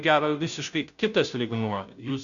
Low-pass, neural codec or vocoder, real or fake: 7.2 kHz; codec, 16 kHz, 0.5 kbps, FunCodec, trained on Chinese and English, 25 frames a second; fake